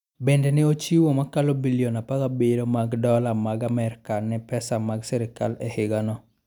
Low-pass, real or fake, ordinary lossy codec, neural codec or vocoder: 19.8 kHz; real; none; none